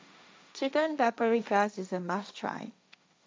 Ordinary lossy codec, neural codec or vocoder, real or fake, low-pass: none; codec, 16 kHz, 1.1 kbps, Voila-Tokenizer; fake; none